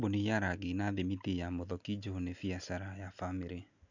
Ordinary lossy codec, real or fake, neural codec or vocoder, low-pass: none; real; none; 7.2 kHz